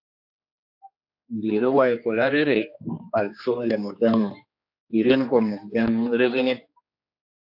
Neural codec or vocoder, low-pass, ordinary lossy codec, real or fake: codec, 16 kHz, 2 kbps, X-Codec, HuBERT features, trained on general audio; 5.4 kHz; AAC, 48 kbps; fake